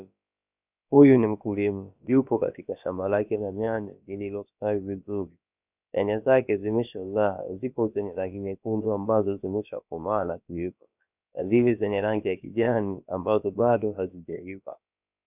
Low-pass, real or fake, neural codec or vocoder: 3.6 kHz; fake; codec, 16 kHz, about 1 kbps, DyCAST, with the encoder's durations